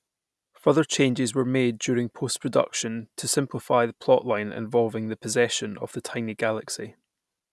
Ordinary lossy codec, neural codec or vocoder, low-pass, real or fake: none; none; none; real